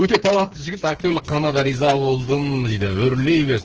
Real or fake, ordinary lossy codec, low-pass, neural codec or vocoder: fake; Opus, 16 kbps; 7.2 kHz; codec, 16 kHz, 4 kbps, FreqCodec, smaller model